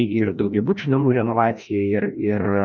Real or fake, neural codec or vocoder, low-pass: fake; codec, 16 kHz, 1 kbps, FreqCodec, larger model; 7.2 kHz